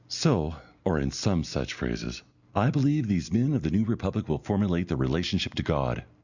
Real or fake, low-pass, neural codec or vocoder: real; 7.2 kHz; none